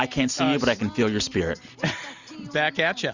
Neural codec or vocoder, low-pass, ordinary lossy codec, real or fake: none; 7.2 kHz; Opus, 64 kbps; real